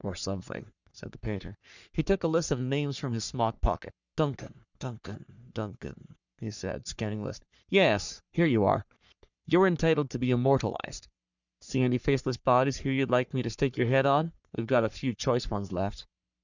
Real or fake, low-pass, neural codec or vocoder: fake; 7.2 kHz; codec, 44.1 kHz, 3.4 kbps, Pupu-Codec